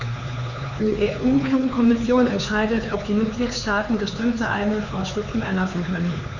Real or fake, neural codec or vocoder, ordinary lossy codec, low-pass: fake; codec, 16 kHz, 4 kbps, X-Codec, HuBERT features, trained on LibriSpeech; none; 7.2 kHz